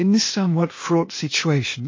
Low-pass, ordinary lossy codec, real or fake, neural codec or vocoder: 7.2 kHz; MP3, 32 kbps; fake; codec, 16 kHz, 0.8 kbps, ZipCodec